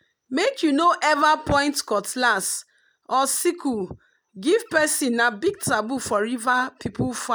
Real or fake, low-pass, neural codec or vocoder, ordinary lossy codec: real; none; none; none